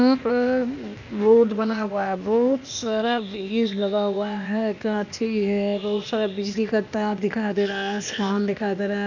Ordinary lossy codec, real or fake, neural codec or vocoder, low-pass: none; fake; codec, 16 kHz, 0.8 kbps, ZipCodec; 7.2 kHz